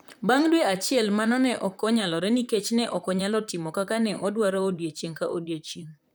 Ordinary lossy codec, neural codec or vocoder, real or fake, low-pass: none; vocoder, 44.1 kHz, 128 mel bands, Pupu-Vocoder; fake; none